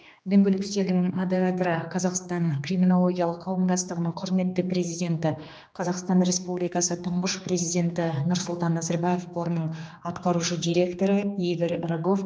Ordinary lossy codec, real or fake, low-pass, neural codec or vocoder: none; fake; none; codec, 16 kHz, 2 kbps, X-Codec, HuBERT features, trained on general audio